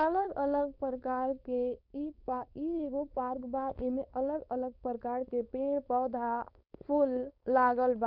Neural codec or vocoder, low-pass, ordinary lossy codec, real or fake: codec, 16 kHz, 4.8 kbps, FACodec; 5.4 kHz; none; fake